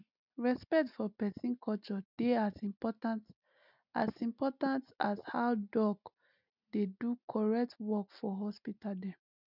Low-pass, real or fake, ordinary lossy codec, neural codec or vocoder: 5.4 kHz; real; none; none